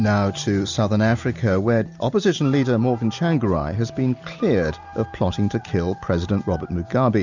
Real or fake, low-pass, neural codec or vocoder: real; 7.2 kHz; none